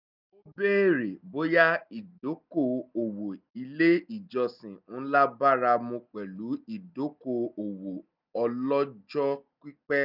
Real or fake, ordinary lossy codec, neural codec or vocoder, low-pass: real; none; none; 5.4 kHz